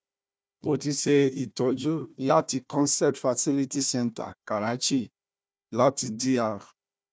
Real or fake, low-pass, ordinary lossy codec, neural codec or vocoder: fake; none; none; codec, 16 kHz, 1 kbps, FunCodec, trained on Chinese and English, 50 frames a second